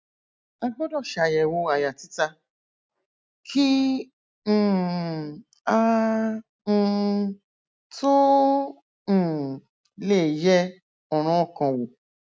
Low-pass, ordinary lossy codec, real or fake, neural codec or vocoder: none; none; real; none